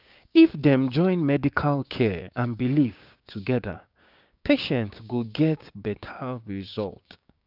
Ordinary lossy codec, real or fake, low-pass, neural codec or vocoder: none; fake; 5.4 kHz; codec, 16 kHz, 6 kbps, DAC